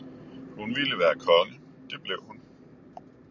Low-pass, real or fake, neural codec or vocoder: 7.2 kHz; real; none